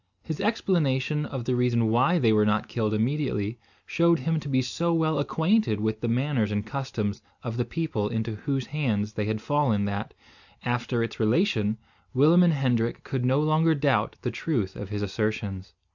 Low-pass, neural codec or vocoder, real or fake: 7.2 kHz; none; real